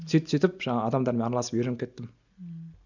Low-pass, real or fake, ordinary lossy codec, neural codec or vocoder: 7.2 kHz; real; none; none